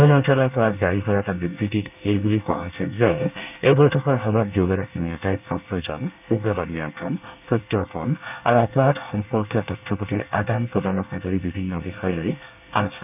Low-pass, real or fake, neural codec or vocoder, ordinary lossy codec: 3.6 kHz; fake; codec, 24 kHz, 1 kbps, SNAC; none